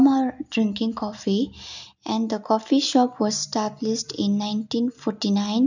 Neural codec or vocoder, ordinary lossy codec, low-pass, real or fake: none; none; 7.2 kHz; real